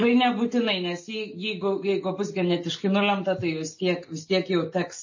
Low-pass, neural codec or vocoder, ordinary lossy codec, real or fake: 7.2 kHz; none; MP3, 32 kbps; real